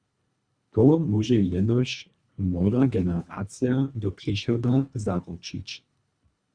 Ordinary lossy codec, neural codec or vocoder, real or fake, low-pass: Opus, 64 kbps; codec, 24 kHz, 1.5 kbps, HILCodec; fake; 9.9 kHz